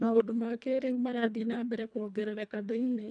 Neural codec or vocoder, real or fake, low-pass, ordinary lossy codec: codec, 24 kHz, 1.5 kbps, HILCodec; fake; 9.9 kHz; none